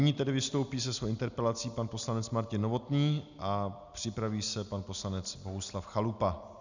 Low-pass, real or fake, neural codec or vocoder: 7.2 kHz; real; none